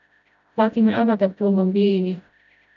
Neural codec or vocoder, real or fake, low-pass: codec, 16 kHz, 0.5 kbps, FreqCodec, smaller model; fake; 7.2 kHz